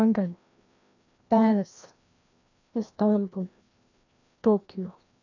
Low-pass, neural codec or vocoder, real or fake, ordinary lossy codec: 7.2 kHz; codec, 16 kHz, 1 kbps, FreqCodec, larger model; fake; none